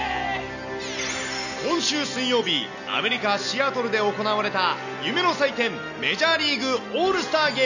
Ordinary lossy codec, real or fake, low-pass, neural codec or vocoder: none; real; 7.2 kHz; none